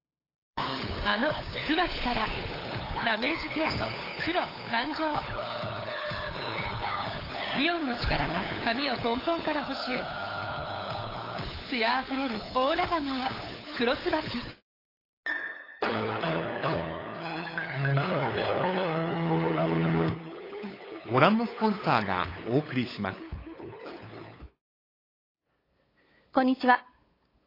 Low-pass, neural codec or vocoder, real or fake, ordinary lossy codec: 5.4 kHz; codec, 16 kHz, 8 kbps, FunCodec, trained on LibriTTS, 25 frames a second; fake; AAC, 24 kbps